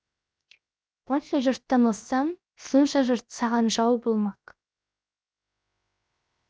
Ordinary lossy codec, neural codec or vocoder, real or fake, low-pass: none; codec, 16 kHz, 0.7 kbps, FocalCodec; fake; none